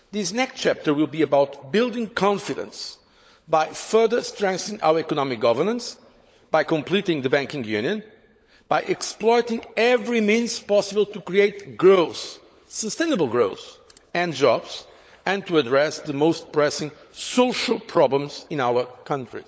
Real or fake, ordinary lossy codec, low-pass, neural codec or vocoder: fake; none; none; codec, 16 kHz, 16 kbps, FunCodec, trained on LibriTTS, 50 frames a second